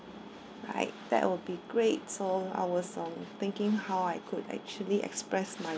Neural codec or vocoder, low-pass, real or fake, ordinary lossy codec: none; none; real; none